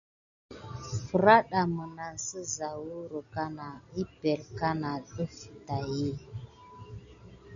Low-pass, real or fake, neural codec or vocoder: 7.2 kHz; real; none